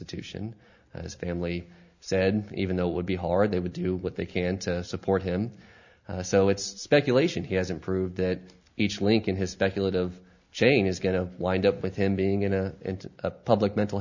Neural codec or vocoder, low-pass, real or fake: none; 7.2 kHz; real